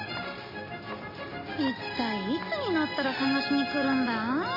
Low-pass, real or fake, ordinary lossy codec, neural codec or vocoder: 5.4 kHz; real; MP3, 24 kbps; none